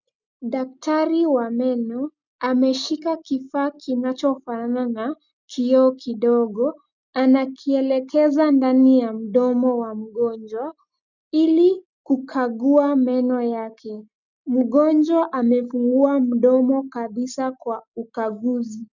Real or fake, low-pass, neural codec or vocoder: real; 7.2 kHz; none